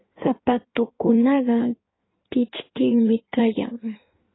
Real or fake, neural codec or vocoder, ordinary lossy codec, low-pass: fake; codec, 16 kHz in and 24 kHz out, 1.1 kbps, FireRedTTS-2 codec; AAC, 16 kbps; 7.2 kHz